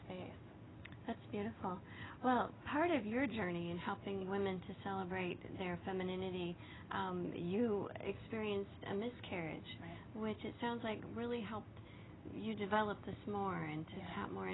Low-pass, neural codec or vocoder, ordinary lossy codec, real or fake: 7.2 kHz; none; AAC, 16 kbps; real